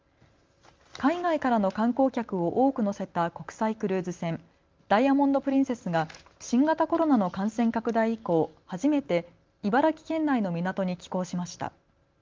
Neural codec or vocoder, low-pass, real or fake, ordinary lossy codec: none; 7.2 kHz; real; Opus, 32 kbps